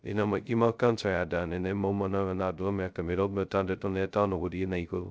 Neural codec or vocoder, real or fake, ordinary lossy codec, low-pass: codec, 16 kHz, 0.2 kbps, FocalCodec; fake; none; none